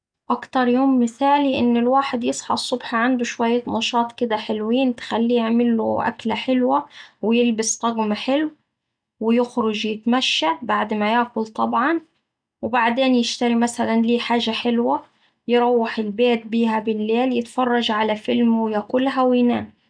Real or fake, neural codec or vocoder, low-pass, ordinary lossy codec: real; none; 9.9 kHz; none